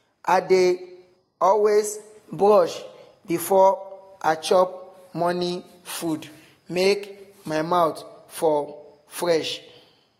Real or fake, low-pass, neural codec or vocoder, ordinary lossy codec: real; 19.8 kHz; none; AAC, 48 kbps